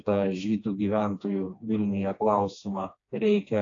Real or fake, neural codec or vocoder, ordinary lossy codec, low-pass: fake; codec, 16 kHz, 2 kbps, FreqCodec, smaller model; AAC, 48 kbps; 7.2 kHz